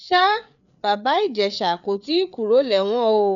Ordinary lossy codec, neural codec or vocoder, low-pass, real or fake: none; codec, 16 kHz, 4 kbps, FreqCodec, larger model; 7.2 kHz; fake